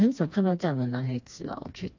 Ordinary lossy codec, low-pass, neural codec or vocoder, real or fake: AAC, 48 kbps; 7.2 kHz; codec, 16 kHz, 2 kbps, FreqCodec, smaller model; fake